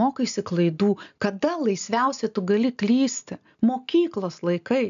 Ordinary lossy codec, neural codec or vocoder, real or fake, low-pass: AAC, 96 kbps; none; real; 7.2 kHz